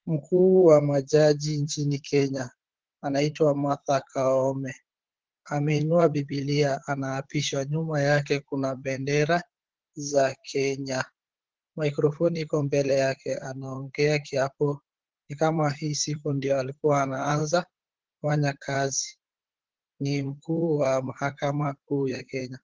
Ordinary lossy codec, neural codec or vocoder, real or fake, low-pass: Opus, 16 kbps; vocoder, 44.1 kHz, 128 mel bands, Pupu-Vocoder; fake; 7.2 kHz